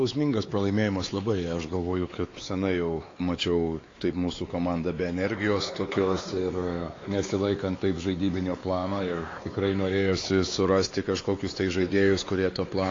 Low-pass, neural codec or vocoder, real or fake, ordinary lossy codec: 7.2 kHz; codec, 16 kHz, 4 kbps, X-Codec, WavLM features, trained on Multilingual LibriSpeech; fake; AAC, 32 kbps